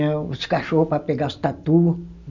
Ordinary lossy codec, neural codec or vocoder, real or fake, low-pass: none; none; real; 7.2 kHz